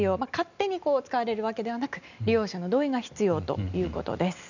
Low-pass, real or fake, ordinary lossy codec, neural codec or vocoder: 7.2 kHz; real; none; none